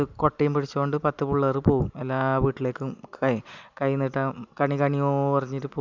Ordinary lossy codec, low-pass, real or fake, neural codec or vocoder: none; 7.2 kHz; real; none